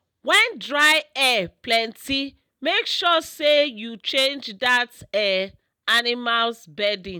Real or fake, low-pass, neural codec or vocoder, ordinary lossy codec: real; none; none; none